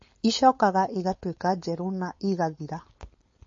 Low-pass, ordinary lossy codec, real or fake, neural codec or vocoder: 7.2 kHz; MP3, 32 kbps; fake; codec, 16 kHz, 4.8 kbps, FACodec